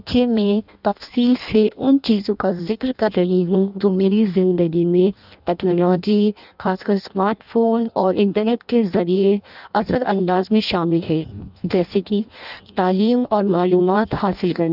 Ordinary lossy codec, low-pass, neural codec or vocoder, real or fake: none; 5.4 kHz; codec, 16 kHz in and 24 kHz out, 0.6 kbps, FireRedTTS-2 codec; fake